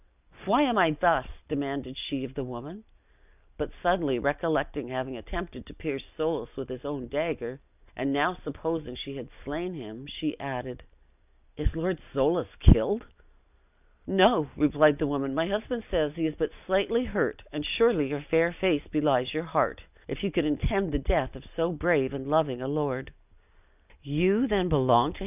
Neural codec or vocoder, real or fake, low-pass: none; real; 3.6 kHz